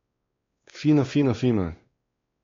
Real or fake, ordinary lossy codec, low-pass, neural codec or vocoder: fake; AAC, 32 kbps; 7.2 kHz; codec, 16 kHz, 2 kbps, X-Codec, WavLM features, trained on Multilingual LibriSpeech